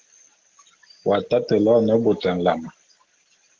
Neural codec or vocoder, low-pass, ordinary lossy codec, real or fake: none; 7.2 kHz; Opus, 16 kbps; real